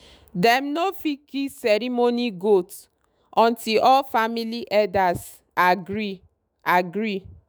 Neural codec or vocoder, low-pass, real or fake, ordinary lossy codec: autoencoder, 48 kHz, 128 numbers a frame, DAC-VAE, trained on Japanese speech; none; fake; none